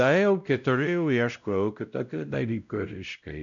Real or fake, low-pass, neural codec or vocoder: fake; 7.2 kHz; codec, 16 kHz, 0.5 kbps, X-Codec, WavLM features, trained on Multilingual LibriSpeech